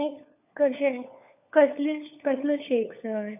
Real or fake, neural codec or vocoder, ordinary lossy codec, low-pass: fake; codec, 16 kHz, 4 kbps, FunCodec, trained on LibriTTS, 50 frames a second; none; 3.6 kHz